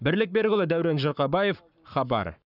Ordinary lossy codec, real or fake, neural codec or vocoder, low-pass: none; fake; codec, 44.1 kHz, 7.8 kbps, Pupu-Codec; 5.4 kHz